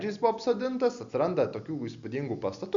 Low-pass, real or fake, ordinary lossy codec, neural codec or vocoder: 7.2 kHz; real; Opus, 64 kbps; none